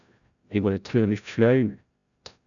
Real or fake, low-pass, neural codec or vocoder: fake; 7.2 kHz; codec, 16 kHz, 0.5 kbps, FreqCodec, larger model